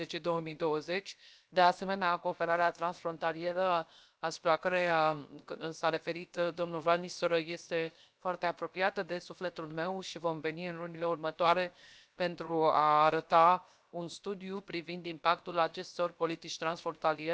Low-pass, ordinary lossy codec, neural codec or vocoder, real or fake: none; none; codec, 16 kHz, 0.7 kbps, FocalCodec; fake